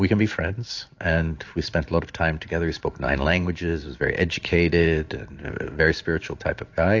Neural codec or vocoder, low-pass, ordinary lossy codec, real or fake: vocoder, 44.1 kHz, 128 mel bands every 512 samples, BigVGAN v2; 7.2 kHz; AAC, 48 kbps; fake